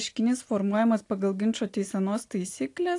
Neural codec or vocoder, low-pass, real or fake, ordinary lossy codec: none; 10.8 kHz; real; AAC, 48 kbps